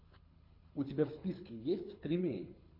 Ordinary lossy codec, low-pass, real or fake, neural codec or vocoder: MP3, 32 kbps; 5.4 kHz; fake; codec, 24 kHz, 6 kbps, HILCodec